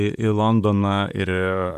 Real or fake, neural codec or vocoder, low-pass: fake; vocoder, 44.1 kHz, 128 mel bands, Pupu-Vocoder; 14.4 kHz